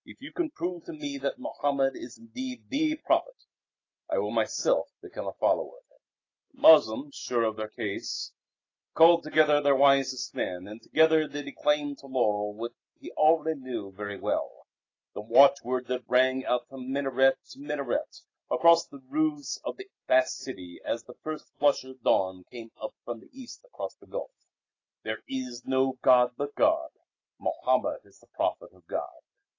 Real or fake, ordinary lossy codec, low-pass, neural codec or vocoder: real; AAC, 32 kbps; 7.2 kHz; none